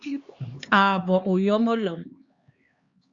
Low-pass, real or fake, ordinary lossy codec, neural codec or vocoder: 7.2 kHz; fake; Opus, 64 kbps; codec, 16 kHz, 2 kbps, X-Codec, HuBERT features, trained on LibriSpeech